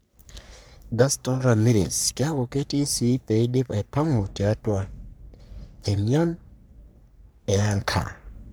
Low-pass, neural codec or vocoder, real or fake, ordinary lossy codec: none; codec, 44.1 kHz, 3.4 kbps, Pupu-Codec; fake; none